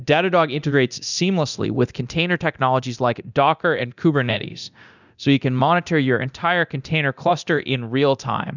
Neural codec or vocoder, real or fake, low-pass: codec, 24 kHz, 0.9 kbps, DualCodec; fake; 7.2 kHz